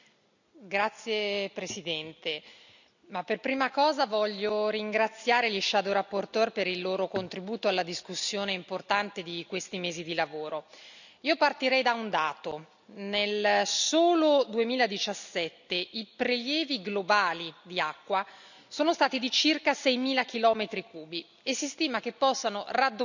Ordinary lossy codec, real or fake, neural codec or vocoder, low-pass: none; real; none; 7.2 kHz